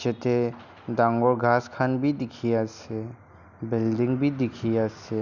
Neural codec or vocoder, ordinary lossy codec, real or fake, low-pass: none; none; real; 7.2 kHz